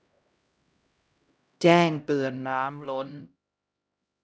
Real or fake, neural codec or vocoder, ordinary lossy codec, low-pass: fake; codec, 16 kHz, 0.5 kbps, X-Codec, HuBERT features, trained on LibriSpeech; none; none